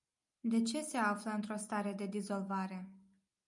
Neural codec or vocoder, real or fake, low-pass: none; real; 10.8 kHz